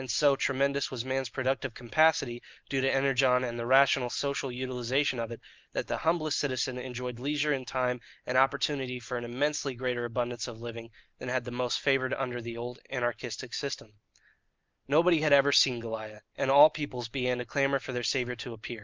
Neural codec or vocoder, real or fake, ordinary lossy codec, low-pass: none; real; Opus, 24 kbps; 7.2 kHz